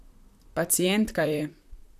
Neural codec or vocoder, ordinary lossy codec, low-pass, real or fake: vocoder, 44.1 kHz, 128 mel bands, Pupu-Vocoder; none; 14.4 kHz; fake